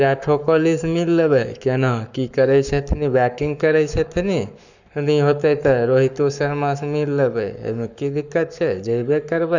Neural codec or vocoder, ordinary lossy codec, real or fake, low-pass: codec, 44.1 kHz, 7.8 kbps, Pupu-Codec; none; fake; 7.2 kHz